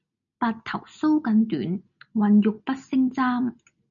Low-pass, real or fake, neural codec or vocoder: 7.2 kHz; real; none